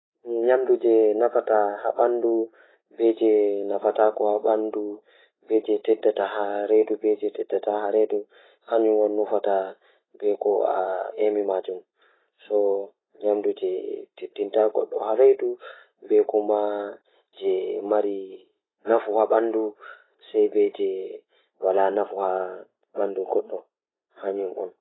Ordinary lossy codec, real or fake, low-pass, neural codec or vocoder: AAC, 16 kbps; real; 7.2 kHz; none